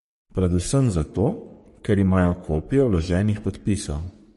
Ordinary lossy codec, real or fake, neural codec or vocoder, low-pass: MP3, 48 kbps; fake; codec, 44.1 kHz, 3.4 kbps, Pupu-Codec; 14.4 kHz